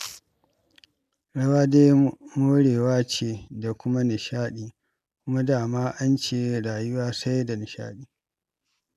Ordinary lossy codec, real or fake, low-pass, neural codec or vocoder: none; real; 14.4 kHz; none